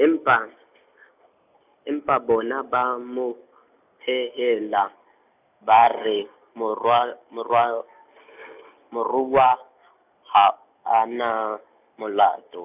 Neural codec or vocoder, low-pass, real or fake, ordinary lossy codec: none; 3.6 kHz; real; none